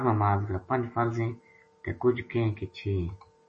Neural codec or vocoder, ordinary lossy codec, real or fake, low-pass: none; MP3, 32 kbps; real; 10.8 kHz